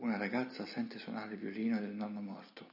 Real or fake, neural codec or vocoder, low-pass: real; none; 5.4 kHz